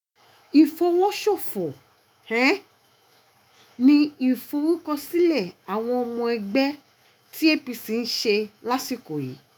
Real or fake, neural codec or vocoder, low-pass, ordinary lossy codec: fake; autoencoder, 48 kHz, 128 numbers a frame, DAC-VAE, trained on Japanese speech; none; none